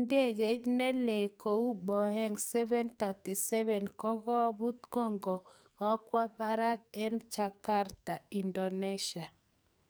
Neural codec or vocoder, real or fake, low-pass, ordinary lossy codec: codec, 44.1 kHz, 2.6 kbps, SNAC; fake; none; none